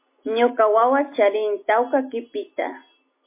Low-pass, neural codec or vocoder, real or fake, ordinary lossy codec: 3.6 kHz; none; real; MP3, 24 kbps